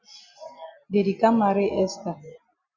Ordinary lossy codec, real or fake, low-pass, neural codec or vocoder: Opus, 64 kbps; real; 7.2 kHz; none